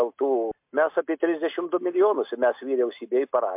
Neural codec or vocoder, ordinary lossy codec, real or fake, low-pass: none; MP3, 32 kbps; real; 3.6 kHz